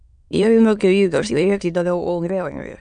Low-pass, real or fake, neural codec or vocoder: 9.9 kHz; fake; autoencoder, 22.05 kHz, a latent of 192 numbers a frame, VITS, trained on many speakers